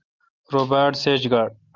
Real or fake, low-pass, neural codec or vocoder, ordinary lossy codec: real; 7.2 kHz; none; Opus, 24 kbps